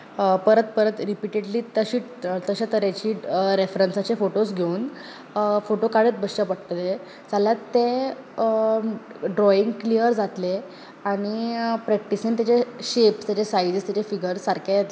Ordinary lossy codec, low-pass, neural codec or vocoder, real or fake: none; none; none; real